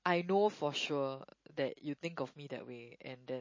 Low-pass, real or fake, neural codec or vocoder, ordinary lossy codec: 7.2 kHz; real; none; MP3, 32 kbps